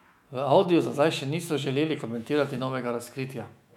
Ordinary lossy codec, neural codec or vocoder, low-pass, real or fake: MP3, 96 kbps; autoencoder, 48 kHz, 128 numbers a frame, DAC-VAE, trained on Japanese speech; 19.8 kHz; fake